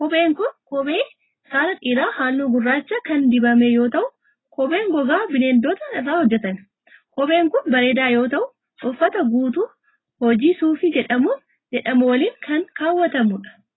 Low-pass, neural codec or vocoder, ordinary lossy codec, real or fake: 7.2 kHz; none; AAC, 16 kbps; real